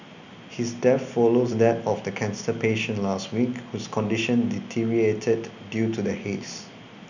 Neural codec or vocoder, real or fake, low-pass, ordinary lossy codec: none; real; 7.2 kHz; none